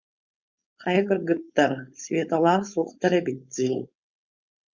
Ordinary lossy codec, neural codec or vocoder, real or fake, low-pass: Opus, 64 kbps; codec, 16 kHz, 4.8 kbps, FACodec; fake; 7.2 kHz